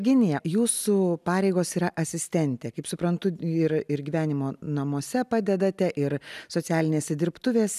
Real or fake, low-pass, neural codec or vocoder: real; 14.4 kHz; none